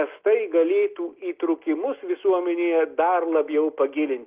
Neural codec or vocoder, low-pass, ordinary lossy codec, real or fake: none; 3.6 kHz; Opus, 32 kbps; real